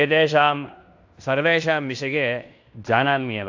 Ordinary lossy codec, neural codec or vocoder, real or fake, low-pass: AAC, 48 kbps; codec, 16 kHz in and 24 kHz out, 0.9 kbps, LongCat-Audio-Codec, fine tuned four codebook decoder; fake; 7.2 kHz